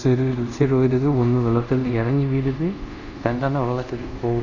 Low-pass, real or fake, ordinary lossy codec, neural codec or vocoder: 7.2 kHz; fake; none; codec, 24 kHz, 0.5 kbps, DualCodec